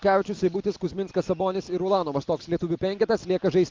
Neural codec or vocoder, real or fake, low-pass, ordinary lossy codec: none; real; 7.2 kHz; Opus, 24 kbps